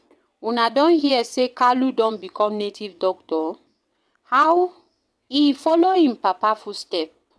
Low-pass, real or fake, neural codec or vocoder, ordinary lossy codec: none; fake; vocoder, 22.05 kHz, 80 mel bands, WaveNeXt; none